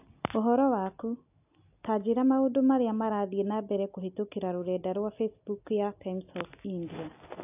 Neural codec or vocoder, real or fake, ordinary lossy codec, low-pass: none; real; AAC, 32 kbps; 3.6 kHz